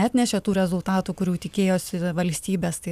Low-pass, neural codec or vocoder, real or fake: 14.4 kHz; none; real